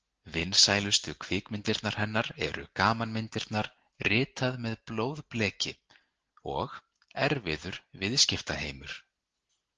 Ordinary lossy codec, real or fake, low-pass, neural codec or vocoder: Opus, 16 kbps; real; 7.2 kHz; none